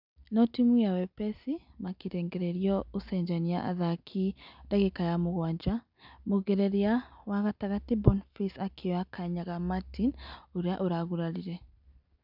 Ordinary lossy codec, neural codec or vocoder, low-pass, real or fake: none; none; 5.4 kHz; real